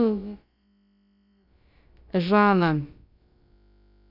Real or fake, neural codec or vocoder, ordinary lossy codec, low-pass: fake; codec, 16 kHz, about 1 kbps, DyCAST, with the encoder's durations; none; 5.4 kHz